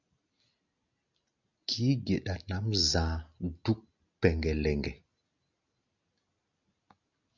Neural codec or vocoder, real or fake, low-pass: none; real; 7.2 kHz